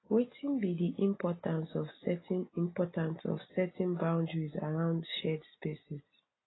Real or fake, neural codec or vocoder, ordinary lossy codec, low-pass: real; none; AAC, 16 kbps; 7.2 kHz